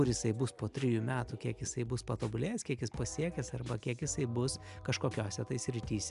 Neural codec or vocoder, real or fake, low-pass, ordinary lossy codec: none; real; 10.8 kHz; MP3, 96 kbps